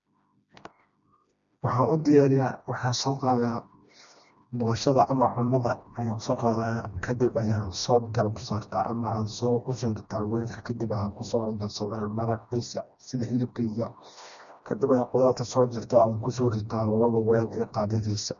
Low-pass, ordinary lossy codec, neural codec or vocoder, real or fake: 7.2 kHz; none; codec, 16 kHz, 1 kbps, FreqCodec, smaller model; fake